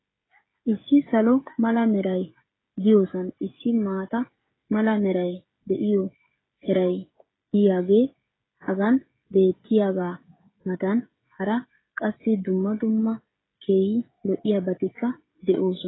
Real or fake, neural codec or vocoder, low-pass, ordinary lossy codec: fake; codec, 16 kHz, 16 kbps, FreqCodec, smaller model; 7.2 kHz; AAC, 16 kbps